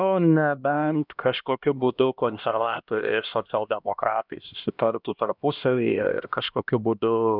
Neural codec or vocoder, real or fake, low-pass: codec, 16 kHz, 1 kbps, X-Codec, HuBERT features, trained on LibriSpeech; fake; 5.4 kHz